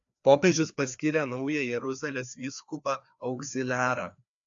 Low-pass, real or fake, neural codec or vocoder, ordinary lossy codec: 7.2 kHz; fake; codec, 16 kHz, 2 kbps, FreqCodec, larger model; AAC, 64 kbps